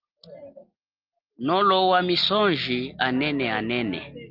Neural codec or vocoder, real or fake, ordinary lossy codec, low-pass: none; real; Opus, 32 kbps; 5.4 kHz